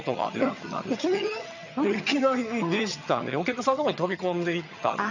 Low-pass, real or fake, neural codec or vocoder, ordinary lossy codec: 7.2 kHz; fake; vocoder, 22.05 kHz, 80 mel bands, HiFi-GAN; none